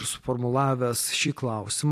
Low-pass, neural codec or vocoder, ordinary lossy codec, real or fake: 14.4 kHz; vocoder, 44.1 kHz, 128 mel bands every 256 samples, BigVGAN v2; AAC, 64 kbps; fake